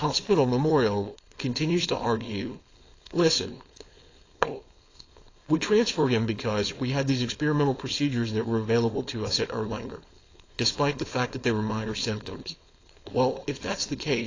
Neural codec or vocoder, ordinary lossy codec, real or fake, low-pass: codec, 16 kHz, 4.8 kbps, FACodec; AAC, 32 kbps; fake; 7.2 kHz